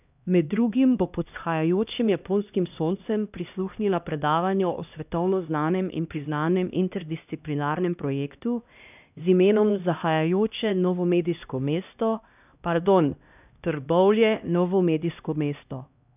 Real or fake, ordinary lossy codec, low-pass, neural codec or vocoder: fake; none; 3.6 kHz; codec, 16 kHz, 1 kbps, X-Codec, HuBERT features, trained on LibriSpeech